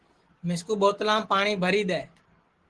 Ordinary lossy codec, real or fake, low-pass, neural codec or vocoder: Opus, 16 kbps; real; 10.8 kHz; none